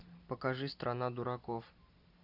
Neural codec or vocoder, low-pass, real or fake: none; 5.4 kHz; real